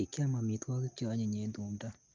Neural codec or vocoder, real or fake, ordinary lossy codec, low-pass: none; real; Opus, 32 kbps; 7.2 kHz